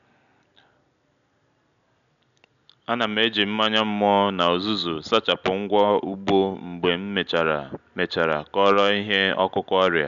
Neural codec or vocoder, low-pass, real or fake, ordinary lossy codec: none; 7.2 kHz; real; none